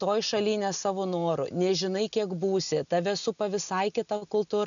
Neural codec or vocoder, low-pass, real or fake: none; 7.2 kHz; real